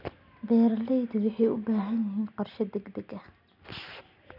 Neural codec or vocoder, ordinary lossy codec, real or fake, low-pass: none; none; real; 5.4 kHz